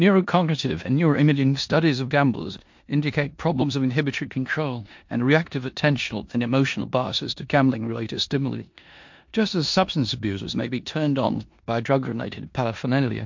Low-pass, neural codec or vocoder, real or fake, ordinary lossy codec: 7.2 kHz; codec, 16 kHz in and 24 kHz out, 0.9 kbps, LongCat-Audio-Codec, four codebook decoder; fake; MP3, 48 kbps